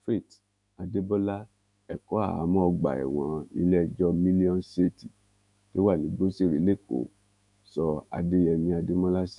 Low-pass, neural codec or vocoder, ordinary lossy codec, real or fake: 10.8 kHz; autoencoder, 48 kHz, 128 numbers a frame, DAC-VAE, trained on Japanese speech; none; fake